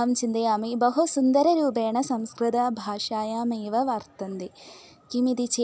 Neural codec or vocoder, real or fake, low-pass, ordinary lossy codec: none; real; none; none